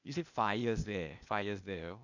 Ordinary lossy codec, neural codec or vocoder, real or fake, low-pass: none; codec, 16 kHz, 0.8 kbps, ZipCodec; fake; 7.2 kHz